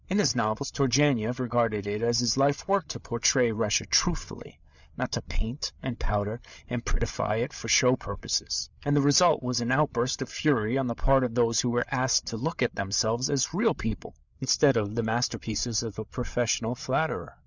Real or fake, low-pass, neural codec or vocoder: fake; 7.2 kHz; codec, 16 kHz, 8 kbps, FreqCodec, larger model